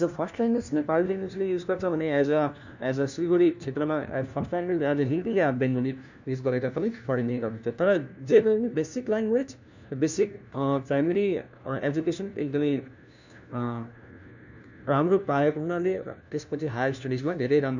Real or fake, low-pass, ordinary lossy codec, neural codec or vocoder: fake; 7.2 kHz; none; codec, 16 kHz, 1 kbps, FunCodec, trained on LibriTTS, 50 frames a second